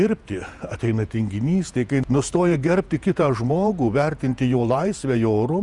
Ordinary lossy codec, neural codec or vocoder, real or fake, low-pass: Opus, 64 kbps; vocoder, 48 kHz, 128 mel bands, Vocos; fake; 10.8 kHz